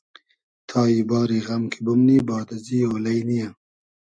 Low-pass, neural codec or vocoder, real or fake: 9.9 kHz; none; real